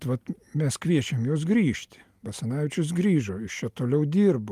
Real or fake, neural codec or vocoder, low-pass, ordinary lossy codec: real; none; 14.4 kHz; Opus, 32 kbps